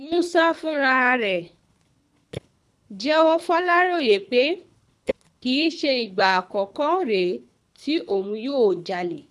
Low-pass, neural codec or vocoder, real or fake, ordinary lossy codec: none; codec, 24 kHz, 3 kbps, HILCodec; fake; none